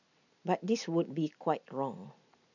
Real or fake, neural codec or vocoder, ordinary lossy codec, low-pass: real; none; none; 7.2 kHz